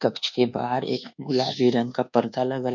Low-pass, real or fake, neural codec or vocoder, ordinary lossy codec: 7.2 kHz; fake; codec, 24 kHz, 1.2 kbps, DualCodec; none